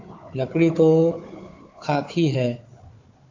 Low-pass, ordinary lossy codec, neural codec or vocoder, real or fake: 7.2 kHz; AAC, 48 kbps; codec, 16 kHz, 4 kbps, FunCodec, trained on Chinese and English, 50 frames a second; fake